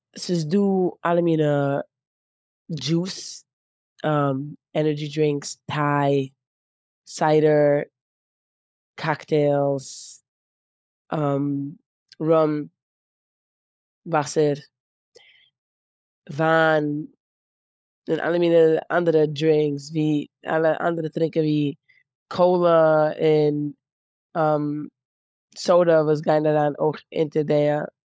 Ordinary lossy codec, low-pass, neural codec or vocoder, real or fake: none; none; codec, 16 kHz, 16 kbps, FunCodec, trained on LibriTTS, 50 frames a second; fake